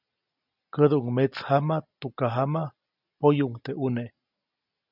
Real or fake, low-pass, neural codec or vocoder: real; 5.4 kHz; none